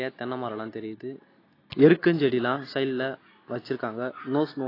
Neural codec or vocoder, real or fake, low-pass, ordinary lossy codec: none; real; 5.4 kHz; AAC, 24 kbps